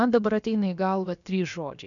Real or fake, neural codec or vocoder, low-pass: fake; codec, 16 kHz, about 1 kbps, DyCAST, with the encoder's durations; 7.2 kHz